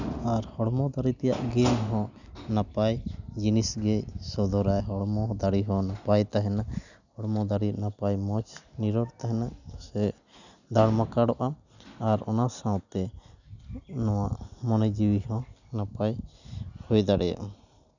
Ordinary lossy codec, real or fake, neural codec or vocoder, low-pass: none; real; none; 7.2 kHz